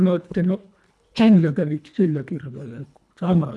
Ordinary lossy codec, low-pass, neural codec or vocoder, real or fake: none; none; codec, 24 kHz, 1.5 kbps, HILCodec; fake